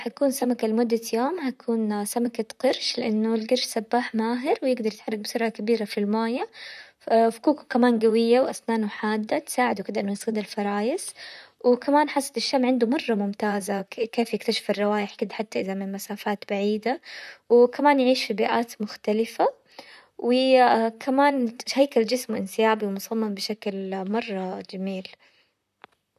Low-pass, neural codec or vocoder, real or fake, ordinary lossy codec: 14.4 kHz; vocoder, 44.1 kHz, 128 mel bands, Pupu-Vocoder; fake; none